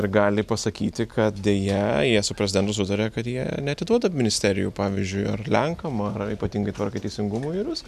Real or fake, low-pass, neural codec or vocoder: real; 14.4 kHz; none